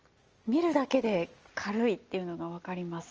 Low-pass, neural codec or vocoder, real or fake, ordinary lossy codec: 7.2 kHz; none; real; Opus, 24 kbps